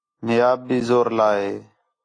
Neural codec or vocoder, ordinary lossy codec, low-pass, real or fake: none; AAC, 32 kbps; 9.9 kHz; real